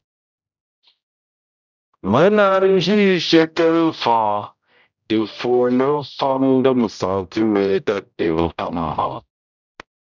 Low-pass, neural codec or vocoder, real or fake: 7.2 kHz; codec, 16 kHz, 0.5 kbps, X-Codec, HuBERT features, trained on general audio; fake